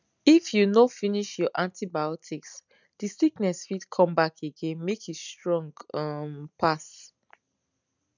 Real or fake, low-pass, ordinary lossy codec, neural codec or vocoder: real; 7.2 kHz; none; none